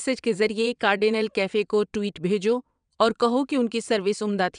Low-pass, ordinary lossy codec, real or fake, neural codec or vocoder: 9.9 kHz; none; fake; vocoder, 22.05 kHz, 80 mel bands, WaveNeXt